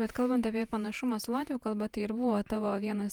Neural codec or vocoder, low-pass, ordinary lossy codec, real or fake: vocoder, 48 kHz, 128 mel bands, Vocos; 19.8 kHz; Opus, 32 kbps; fake